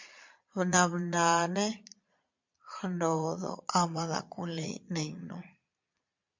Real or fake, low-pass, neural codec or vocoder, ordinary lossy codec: fake; 7.2 kHz; vocoder, 22.05 kHz, 80 mel bands, Vocos; MP3, 48 kbps